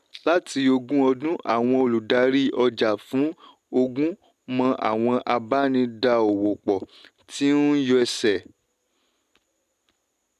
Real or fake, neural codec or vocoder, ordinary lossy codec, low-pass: real; none; AAC, 96 kbps; 14.4 kHz